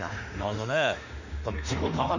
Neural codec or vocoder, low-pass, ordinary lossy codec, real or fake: autoencoder, 48 kHz, 32 numbers a frame, DAC-VAE, trained on Japanese speech; 7.2 kHz; none; fake